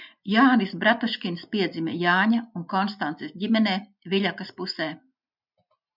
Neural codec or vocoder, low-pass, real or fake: none; 5.4 kHz; real